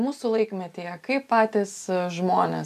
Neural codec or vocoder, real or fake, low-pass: none; real; 14.4 kHz